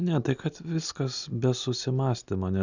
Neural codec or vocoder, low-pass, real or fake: none; 7.2 kHz; real